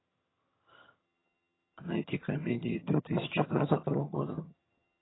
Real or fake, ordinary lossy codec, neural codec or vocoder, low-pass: fake; AAC, 16 kbps; vocoder, 22.05 kHz, 80 mel bands, HiFi-GAN; 7.2 kHz